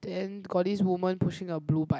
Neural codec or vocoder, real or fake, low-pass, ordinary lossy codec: none; real; none; none